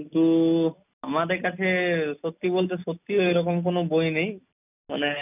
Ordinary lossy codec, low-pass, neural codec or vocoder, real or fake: none; 3.6 kHz; none; real